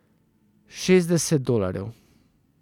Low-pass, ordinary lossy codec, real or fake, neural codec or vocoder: 19.8 kHz; none; real; none